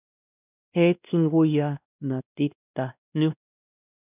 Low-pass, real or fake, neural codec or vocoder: 3.6 kHz; fake; codec, 16 kHz, 1 kbps, X-Codec, WavLM features, trained on Multilingual LibriSpeech